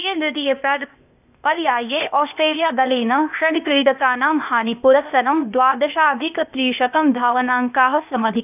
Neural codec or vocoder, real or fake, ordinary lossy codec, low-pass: codec, 16 kHz, 0.8 kbps, ZipCodec; fake; none; 3.6 kHz